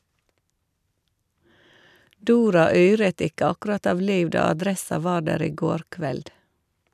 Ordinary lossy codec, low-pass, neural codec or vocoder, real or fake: none; 14.4 kHz; vocoder, 44.1 kHz, 128 mel bands every 256 samples, BigVGAN v2; fake